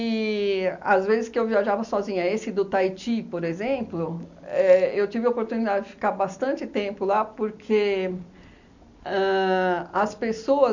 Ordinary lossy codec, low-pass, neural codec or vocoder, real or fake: none; 7.2 kHz; none; real